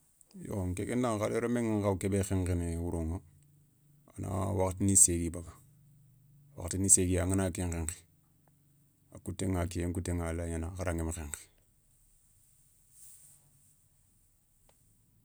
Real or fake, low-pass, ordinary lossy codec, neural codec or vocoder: real; none; none; none